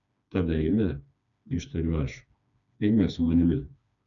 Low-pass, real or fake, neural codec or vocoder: 7.2 kHz; fake; codec, 16 kHz, 2 kbps, FreqCodec, smaller model